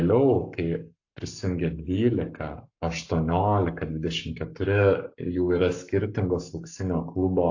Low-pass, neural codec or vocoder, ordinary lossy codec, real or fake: 7.2 kHz; codec, 44.1 kHz, 7.8 kbps, Pupu-Codec; AAC, 48 kbps; fake